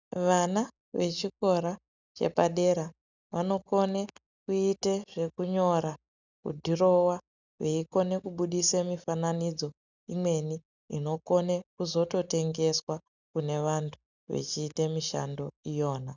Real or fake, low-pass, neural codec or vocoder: real; 7.2 kHz; none